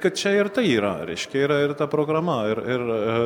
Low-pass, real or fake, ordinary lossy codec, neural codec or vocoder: 19.8 kHz; real; MP3, 96 kbps; none